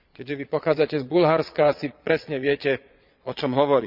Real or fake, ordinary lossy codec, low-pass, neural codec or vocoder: fake; none; 5.4 kHz; vocoder, 22.05 kHz, 80 mel bands, Vocos